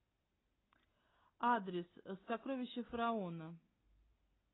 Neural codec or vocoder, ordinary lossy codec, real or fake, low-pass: none; AAC, 16 kbps; real; 7.2 kHz